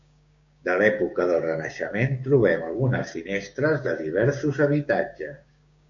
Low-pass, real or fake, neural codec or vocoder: 7.2 kHz; fake; codec, 16 kHz, 6 kbps, DAC